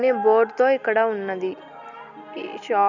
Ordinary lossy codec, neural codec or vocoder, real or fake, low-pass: none; none; real; 7.2 kHz